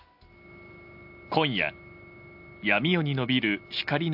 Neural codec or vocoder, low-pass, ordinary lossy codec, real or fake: none; 5.4 kHz; AAC, 48 kbps; real